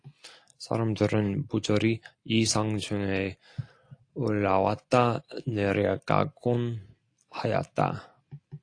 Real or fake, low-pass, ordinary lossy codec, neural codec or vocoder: real; 9.9 kHz; AAC, 48 kbps; none